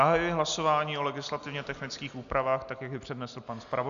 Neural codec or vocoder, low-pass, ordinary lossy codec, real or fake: none; 7.2 kHz; AAC, 96 kbps; real